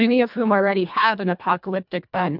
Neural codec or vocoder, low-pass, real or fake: codec, 24 kHz, 1.5 kbps, HILCodec; 5.4 kHz; fake